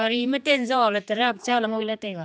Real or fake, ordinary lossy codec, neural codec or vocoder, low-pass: fake; none; codec, 16 kHz, 2 kbps, X-Codec, HuBERT features, trained on general audio; none